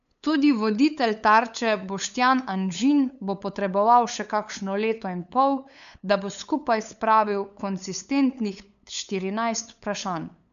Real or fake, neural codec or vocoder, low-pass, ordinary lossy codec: fake; codec, 16 kHz, 8 kbps, FunCodec, trained on LibriTTS, 25 frames a second; 7.2 kHz; none